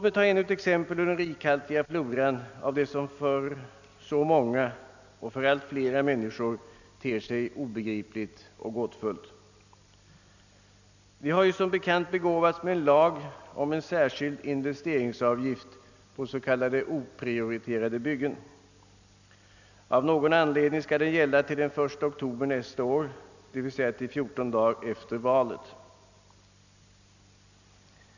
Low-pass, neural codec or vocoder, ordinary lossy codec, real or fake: 7.2 kHz; none; none; real